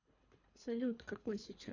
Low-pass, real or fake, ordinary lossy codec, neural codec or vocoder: 7.2 kHz; fake; none; codec, 24 kHz, 3 kbps, HILCodec